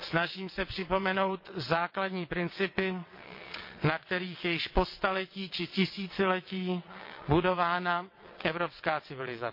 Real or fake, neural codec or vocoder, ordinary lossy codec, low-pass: fake; vocoder, 22.05 kHz, 80 mel bands, WaveNeXt; MP3, 32 kbps; 5.4 kHz